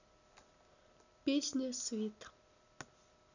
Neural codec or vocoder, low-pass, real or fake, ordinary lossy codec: none; 7.2 kHz; real; none